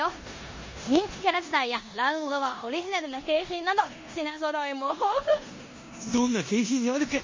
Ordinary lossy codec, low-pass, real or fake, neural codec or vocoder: MP3, 32 kbps; 7.2 kHz; fake; codec, 16 kHz in and 24 kHz out, 0.9 kbps, LongCat-Audio-Codec, four codebook decoder